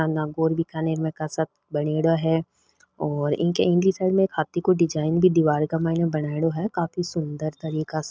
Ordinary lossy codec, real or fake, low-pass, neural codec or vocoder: none; real; none; none